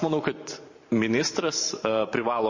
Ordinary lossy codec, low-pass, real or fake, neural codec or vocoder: MP3, 32 kbps; 7.2 kHz; fake; vocoder, 44.1 kHz, 128 mel bands every 512 samples, BigVGAN v2